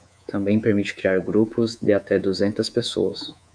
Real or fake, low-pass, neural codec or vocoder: fake; 9.9 kHz; codec, 24 kHz, 3.1 kbps, DualCodec